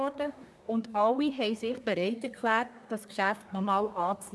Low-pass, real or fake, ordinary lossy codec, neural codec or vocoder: none; fake; none; codec, 24 kHz, 1 kbps, SNAC